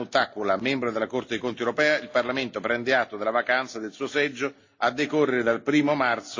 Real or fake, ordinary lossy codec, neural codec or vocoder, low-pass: real; AAC, 48 kbps; none; 7.2 kHz